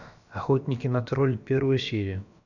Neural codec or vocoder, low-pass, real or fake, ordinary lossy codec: codec, 16 kHz, about 1 kbps, DyCAST, with the encoder's durations; 7.2 kHz; fake; none